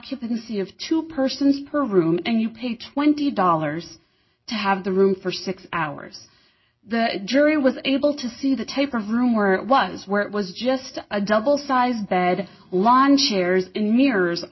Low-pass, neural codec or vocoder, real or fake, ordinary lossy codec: 7.2 kHz; none; real; MP3, 24 kbps